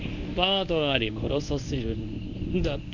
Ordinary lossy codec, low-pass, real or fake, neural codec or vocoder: none; 7.2 kHz; fake; codec, 24 kHz, 0.9 kbps, WavTokenizer, medium speech release version 1